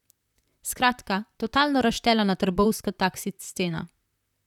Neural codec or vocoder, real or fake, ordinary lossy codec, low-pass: vocoder, 44.1 kHz, 128 mel bands, Pupu-Vocoder; fake; none; 19.8 kHz